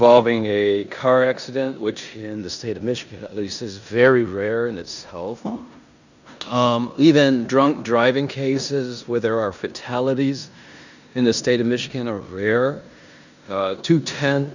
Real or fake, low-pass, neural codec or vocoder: fake; 7.2 kHz; codec, 16 kHz in and 24 kHz out, 0.9 kbps, LongCat-Audio-Codec, four codebook decoder